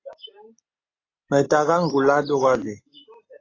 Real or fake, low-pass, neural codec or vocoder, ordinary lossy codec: real; 7.2 kHz; none; AAC, 32 kbps